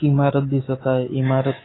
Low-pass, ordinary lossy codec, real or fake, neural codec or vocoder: 7.2 kHz; AAC, 16 kbps; fake; codec, 24 kHz, 3.1 kbps, DualCodec